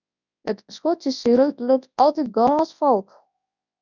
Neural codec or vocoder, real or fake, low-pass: codec, 24 kHz, 0.9 kbps, WavTokenizer, large speech release; fake; 7.2 kHz